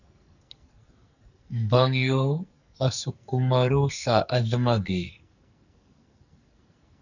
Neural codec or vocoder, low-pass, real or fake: codec, 44.1 kHz, 2.6 kbps, SNAC; 7.2 kHz; fake